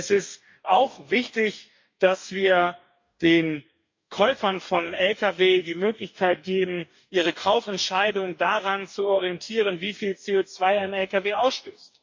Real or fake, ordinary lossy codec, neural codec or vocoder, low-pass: fake; MP3, 48 kbps; codec, 44.1 kHz, 2.6 kbps, DAC; 7.2 kHz